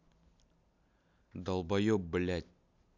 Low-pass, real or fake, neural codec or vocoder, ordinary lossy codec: 7.2 kHz; real; none; none